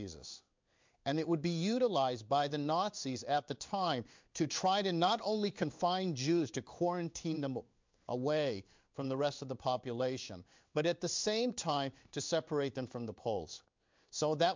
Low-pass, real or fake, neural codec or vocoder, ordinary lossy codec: 7.2 kHz; fake; codec, 16 kHz in and 24 kHz out, 1 kbps, XY-Tokenizer; MP3, 64 kbps